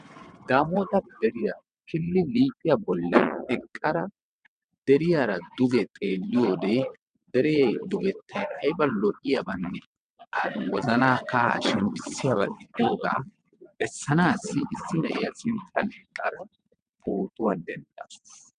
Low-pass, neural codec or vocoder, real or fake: 9.9 kHz; vocoder, 22.05 kHz, 80 mel bands, WaveNeXt; fake